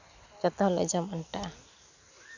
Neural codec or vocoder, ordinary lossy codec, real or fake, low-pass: none; none; real; 7.2 kHz